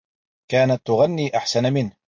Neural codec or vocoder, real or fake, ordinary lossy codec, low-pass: none; real; MP3, 48 kbps; 7.2 kHz